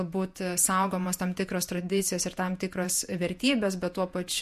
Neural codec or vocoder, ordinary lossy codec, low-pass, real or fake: vocoder, 48 kHz, 128 mel bands, Vocos; MP3, 64 kbps; 14.4 kHz; fake